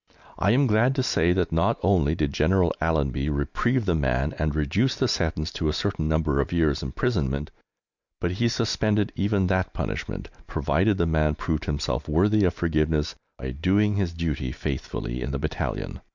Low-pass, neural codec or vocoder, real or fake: 7.2 kHz; none; real